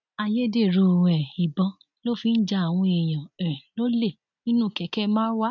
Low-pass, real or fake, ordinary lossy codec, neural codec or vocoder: 7.2 kHz; real; none; none